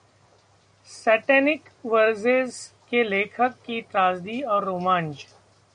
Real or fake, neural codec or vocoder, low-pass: real; none; 9.9 kHz